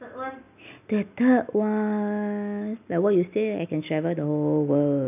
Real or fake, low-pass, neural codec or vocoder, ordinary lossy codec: real; 3.6 kHz; none; none